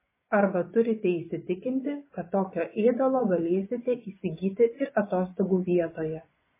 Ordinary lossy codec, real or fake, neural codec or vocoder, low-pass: MP3, 16 kbps; fake; vocoder, 24 kHz, 100 mel bands, Vocos; 3.6 kHz